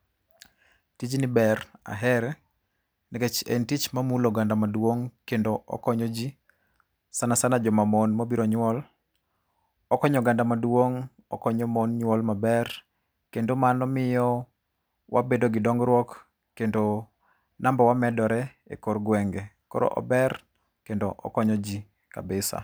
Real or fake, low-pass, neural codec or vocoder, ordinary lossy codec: real; none; none; none